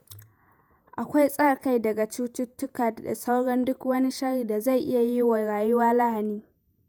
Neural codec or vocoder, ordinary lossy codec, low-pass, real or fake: vocoder, 48 kHz, 128 mel bands, Vocos; none; none; fake